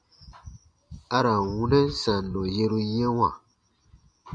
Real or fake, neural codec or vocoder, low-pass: real; none; 9.9 kHz